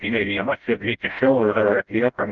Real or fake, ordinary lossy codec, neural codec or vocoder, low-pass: fake; Opus, 24 kbps; codec, 16 kHz, 0.5 kbps, FreqCodec, smaller model; 7.2 kHz